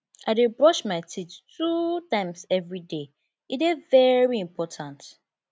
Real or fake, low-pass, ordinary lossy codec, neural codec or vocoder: real; none; none; none